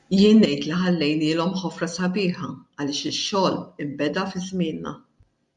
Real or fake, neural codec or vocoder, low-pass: real; none; 10.8 kHz